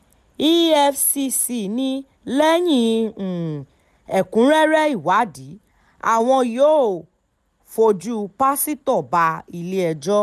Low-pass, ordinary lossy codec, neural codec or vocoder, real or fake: 14.4 kHz; none; none; real